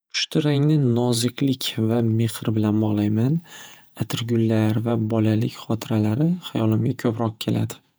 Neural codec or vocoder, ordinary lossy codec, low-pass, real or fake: vocoder, 48 kHz, 128 mel bands, Vocos; none; none; fake